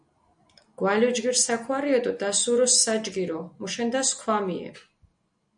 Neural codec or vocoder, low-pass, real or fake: none; 9.9 kHz; real